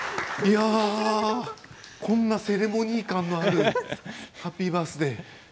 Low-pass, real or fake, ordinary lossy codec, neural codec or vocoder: none; real; none; none